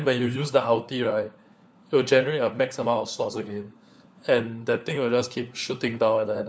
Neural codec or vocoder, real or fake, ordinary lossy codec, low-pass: codec, 16 kHz, 4 kbps, FunCodec, trained on LibriTTS, 50 frames a second; fake; none; none